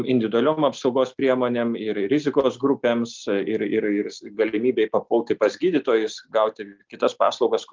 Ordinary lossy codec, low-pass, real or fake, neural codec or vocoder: Opus, 32 kbps; 7.2 kHz; real; none